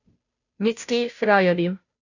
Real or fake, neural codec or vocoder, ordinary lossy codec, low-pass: fake; codec, 16 kHz, 0.5 kbps, FunCodec, trained on Chinese and English, 25 frames a second; none; 7.2 kHz